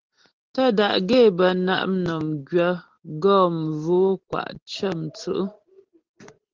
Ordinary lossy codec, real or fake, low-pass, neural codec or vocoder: Opus, 16 kbps; real; 7.2 kHz; none